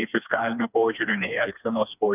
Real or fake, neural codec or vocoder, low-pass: fake; codec, 16 kHz, 2 kbps, FreqCodec, smaller model; 3.6 kHz